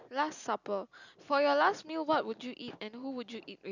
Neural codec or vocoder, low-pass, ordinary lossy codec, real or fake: none; 7.2 kHz; none; real